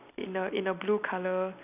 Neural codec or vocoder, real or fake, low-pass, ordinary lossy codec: none; real; 3.6 kHz; none